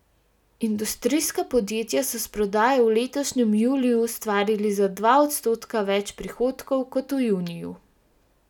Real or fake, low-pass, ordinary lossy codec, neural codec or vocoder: real; 19.8 kHz; none; none